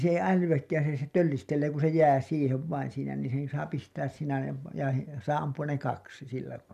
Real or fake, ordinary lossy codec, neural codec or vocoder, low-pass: real; none; none; 14.4 kHz